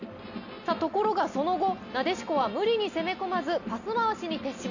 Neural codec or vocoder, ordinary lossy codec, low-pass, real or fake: none; none; 7.2 kHz; real